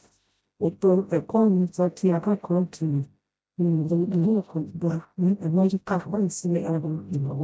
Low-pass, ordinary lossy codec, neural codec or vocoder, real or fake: none; none; codec, 16 kHz, 0.5 kbps, FreqCodec, smaller model; fake